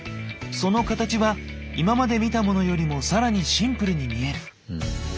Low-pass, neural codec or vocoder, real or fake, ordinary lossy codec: none; none; real; none